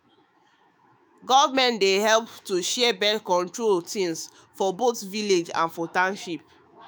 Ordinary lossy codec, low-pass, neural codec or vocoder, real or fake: none; none; autoencoder, 48 kHz, 128 numbers a frame, DAC-VAE, trained on Japanese speech; fake